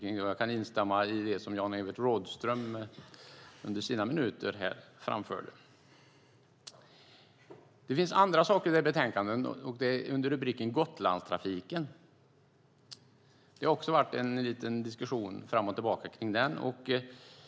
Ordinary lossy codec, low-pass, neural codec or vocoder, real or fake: none; none; none; real